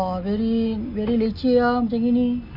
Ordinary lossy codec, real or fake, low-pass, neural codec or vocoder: none; real; 5.4 kHz; none